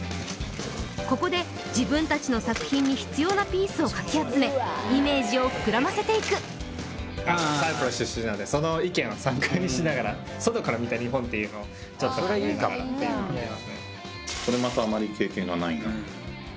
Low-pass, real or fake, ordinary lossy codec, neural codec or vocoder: none; real; none; none